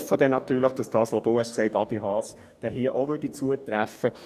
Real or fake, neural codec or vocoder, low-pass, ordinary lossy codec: fake; codec, 44.1 kHz, 2.6 kbps, DAC; 14.4 kHz; none